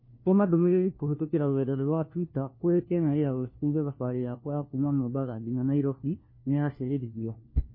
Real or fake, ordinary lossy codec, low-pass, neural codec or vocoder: fake; MP3, 24 kbps; 5.4 kHz; codec, 16 kHz, 1 kbps, FunCodec, trained on LibriTTS, 50 frames a second